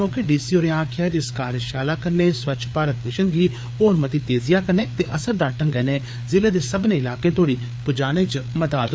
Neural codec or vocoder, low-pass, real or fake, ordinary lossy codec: codec, 16 kHz, 4 kbps, FreqCodec, larger model; none; fake; none